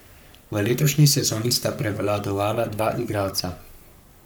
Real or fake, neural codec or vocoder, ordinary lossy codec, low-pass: fake; codec, 44.1 kHz, 3.4 kbps, Pupu-Codec; none; none